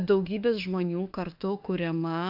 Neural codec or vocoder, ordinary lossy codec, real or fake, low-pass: autoencoder, 48 kHz, 32 numbers a frame, DAC-VAE, trained on Japanese speech; AAC, 48 kbps; fake; 5.4 kHz